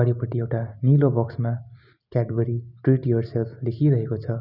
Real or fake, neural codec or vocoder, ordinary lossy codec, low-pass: real; none; none; 5.4 kHz